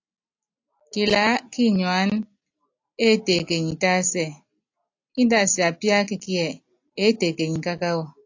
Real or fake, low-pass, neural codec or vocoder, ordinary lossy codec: real; 7.2 kHz; none; AAC, 48 kbps